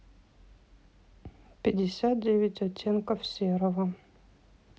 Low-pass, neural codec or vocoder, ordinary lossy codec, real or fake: none; none; none; real